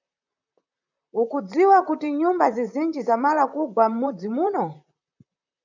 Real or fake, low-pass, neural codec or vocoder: fake; 7.2 kHz; vocoder, 44.1 kHz, 128 mel bands, Pupu-Vocoder